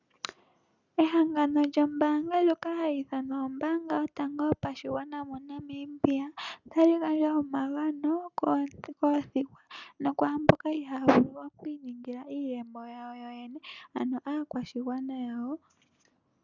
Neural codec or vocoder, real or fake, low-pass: none; real; 7.2 kHz